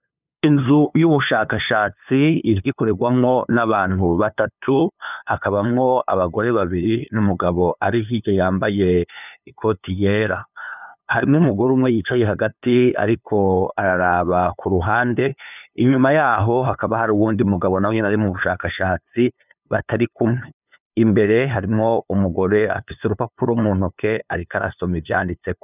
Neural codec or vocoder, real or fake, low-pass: codec, 16 kHz, 2 kbps, FunCodec, trained on LibriTTS, 25 frames a second; fake; 3.6 kHz